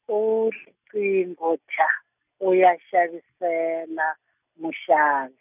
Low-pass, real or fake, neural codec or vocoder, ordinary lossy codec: 3.6 kHz; real; none; none